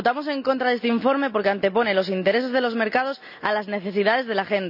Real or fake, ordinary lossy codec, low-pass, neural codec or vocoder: real; none; 5.4 kHz; none